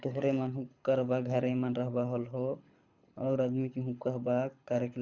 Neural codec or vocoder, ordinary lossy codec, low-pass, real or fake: codec, 24 kHz, 6 kbps, HILCodec; Opus, 64 kbps; 7.2 kHz; fake